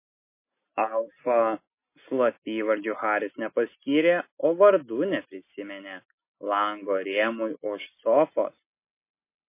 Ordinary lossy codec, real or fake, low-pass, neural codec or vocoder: MP3, 24 kbps; real; 3.6 kHz; none